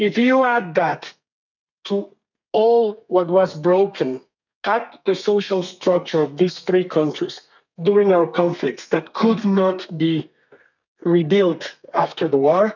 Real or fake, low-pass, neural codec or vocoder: fake; 7.2 kHz; codec, 32 kHz, 1.9 kbps, SNAC